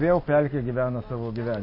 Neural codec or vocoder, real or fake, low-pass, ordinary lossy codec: none; real; 5.4 kHz; MP3, 24 kbps